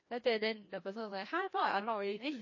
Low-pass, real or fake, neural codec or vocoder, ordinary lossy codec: 7.2 kHz; fake; codec, 16 kHz, 1 kbps, FreqCodec, larger model; MP3, 32 kbps